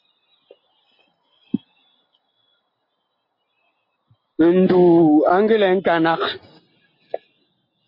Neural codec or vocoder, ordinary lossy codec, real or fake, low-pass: none; MP3, 32 kbps; real; 5.4 kHz